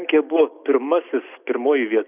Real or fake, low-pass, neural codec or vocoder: real; 3.6 kHz; none